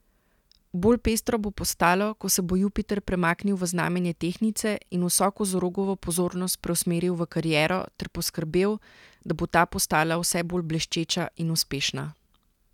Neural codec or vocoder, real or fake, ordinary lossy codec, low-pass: none; real; none; 19.8 kHz